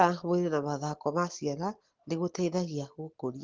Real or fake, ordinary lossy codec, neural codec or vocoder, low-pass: real; Opus, 16 kbps; none; 7.2 kHz